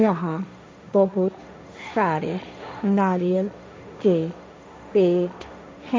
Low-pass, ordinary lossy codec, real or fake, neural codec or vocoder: none; none; fake; codec, 16 kHz, 1.1 kbps, Voila-Tokenizer